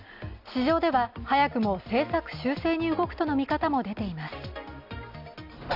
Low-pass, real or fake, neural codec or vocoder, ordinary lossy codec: 5.4 kHz; real; none; Opus, 64 kbps